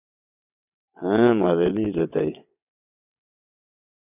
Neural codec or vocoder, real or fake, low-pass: vocoder, 22.05 kHz, 80 mel bands, WaveNeXt; fake; 3.6 kHz